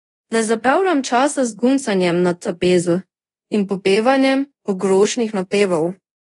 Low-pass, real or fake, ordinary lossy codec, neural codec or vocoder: 10.8 kHz; fake; AAC, 32 kbps; codec, 24 kHz, 0.5 kbps, DualCodec